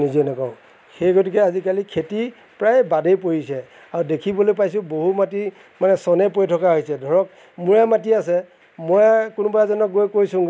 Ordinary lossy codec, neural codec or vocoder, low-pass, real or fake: none; none; none; real